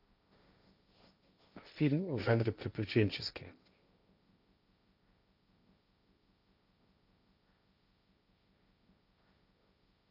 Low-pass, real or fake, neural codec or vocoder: 5.4 kHz; fake; codec, 16 kHz, 1.1 kbps, Voila-Tokenizer